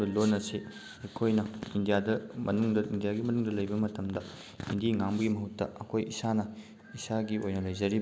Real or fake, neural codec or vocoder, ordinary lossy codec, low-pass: real; none; none; none